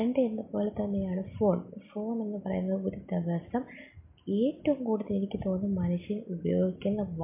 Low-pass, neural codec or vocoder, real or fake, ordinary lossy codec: 3.6 kHz; none; real; MP3, 24 kbps